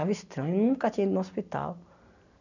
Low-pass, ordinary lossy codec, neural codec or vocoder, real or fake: 7.2 kHz; none; none; real